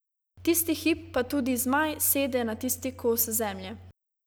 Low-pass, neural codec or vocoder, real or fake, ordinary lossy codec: none; none; real; none